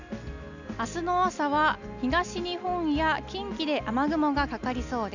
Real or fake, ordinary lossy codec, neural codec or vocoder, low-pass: real; none; none; 7.2 kHz